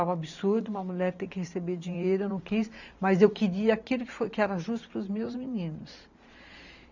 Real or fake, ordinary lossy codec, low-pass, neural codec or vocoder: fake; none; 7.2 kHz; vocoder, 44.1 kHz, 128 mel bands every 512 samples, BigVGAN v2